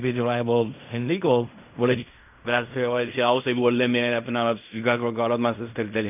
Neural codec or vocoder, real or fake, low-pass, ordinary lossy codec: codec, 16 kHz in and 24 kHz out, 0.4 kbps, LongCat-Audio-Codec, fine tuned four codebook decoder; fake; 3.6 kHz; none